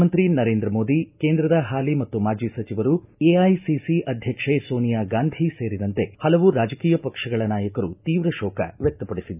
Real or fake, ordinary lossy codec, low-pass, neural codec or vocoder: real; none; 3.6 kHz; none